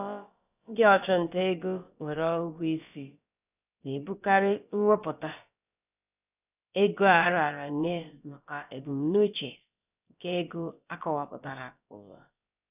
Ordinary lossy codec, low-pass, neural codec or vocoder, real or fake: none; 3.6 kHz; codec, 16 kHz, about 1 kbps, DyCAST, with the encoder's durations; fake